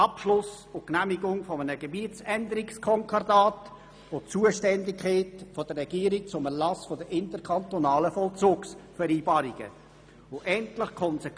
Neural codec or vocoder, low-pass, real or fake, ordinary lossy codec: none; none; real; none